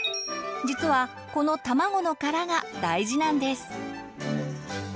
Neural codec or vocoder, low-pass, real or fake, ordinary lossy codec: none; none; real; none